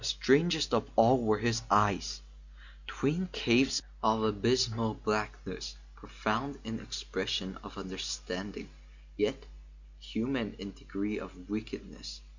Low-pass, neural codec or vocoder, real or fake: 7.2 kHz; none; real